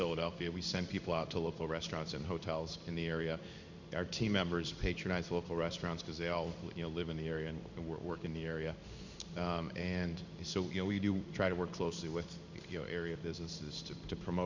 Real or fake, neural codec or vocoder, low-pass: fake; codec, 16 kHz in and 24 kHz out, 1 kbps, XY-Tokenizer; 7.2 kHz